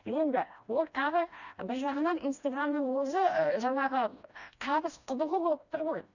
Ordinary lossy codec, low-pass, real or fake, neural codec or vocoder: none; 7.2 kHz; fake; codec, 16 kHz, 1 kbps, FreqCodec, smaller model